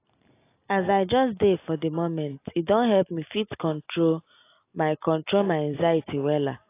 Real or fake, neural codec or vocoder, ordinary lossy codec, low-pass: real; none; AAC, 24 kbps; 3.6 kHz